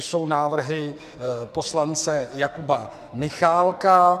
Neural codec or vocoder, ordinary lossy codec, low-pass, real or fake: codec, 44.1 kHz, 2.6 kbps, SNAC; MP3, 96 kbps; 14.4 kHz; fake